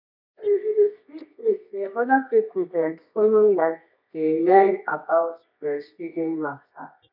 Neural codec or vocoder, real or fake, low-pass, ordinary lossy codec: codec, 24 kHz, 0.9 kbps, WavTokenizer, medium music audio release; fake; 5.4 kHz; none